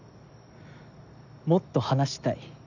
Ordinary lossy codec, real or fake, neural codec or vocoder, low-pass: none; real; none; 7.2 kHz